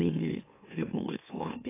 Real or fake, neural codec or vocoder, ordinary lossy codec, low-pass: fake; autoencoder, 44.1 kHz, a latent of 192 numbers a frame, MeloTTS; AAC, 16 kbps; 3.6 kHz